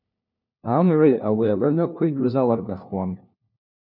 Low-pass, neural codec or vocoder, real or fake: 5.4 kHz; codec, 16 kHz, 1 kbps, FunCodec, trained on LibriTTS, 50 frames a second; fake